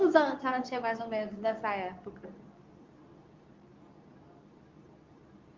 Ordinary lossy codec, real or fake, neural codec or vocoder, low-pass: Opus, 32 kbps; fake; codec, 24 kHz, 0.9 kbps, WavTokenizer, medium speech release version 2; 7.2 kHz